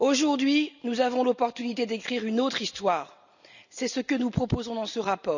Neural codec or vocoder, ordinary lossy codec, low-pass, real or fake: none; none; 7.2 kHz; real